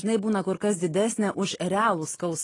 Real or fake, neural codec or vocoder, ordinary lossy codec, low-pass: real; none; AAC, 32 kbps; 10.8 kHz